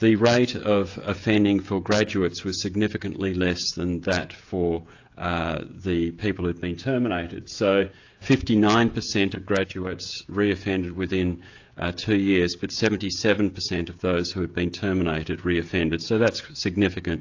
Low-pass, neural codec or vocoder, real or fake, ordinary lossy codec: 7.2 kHz; codec, 16 kHz, 16 kbps, FreqCodec, smaller model; fake; AAC, 48 kbps